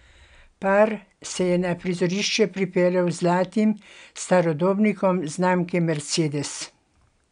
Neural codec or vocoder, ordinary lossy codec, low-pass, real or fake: none; none; 9.9 kHz; real